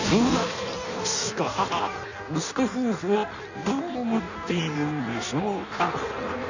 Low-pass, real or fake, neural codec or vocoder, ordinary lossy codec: 7.2 kHz; fake; codec, 16 kHz in and 24 kHz out, 0.6 kbps, FireRedTTS-2 codec; none